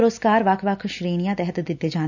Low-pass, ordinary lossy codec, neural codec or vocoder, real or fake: 7.2 kHz; none; none; real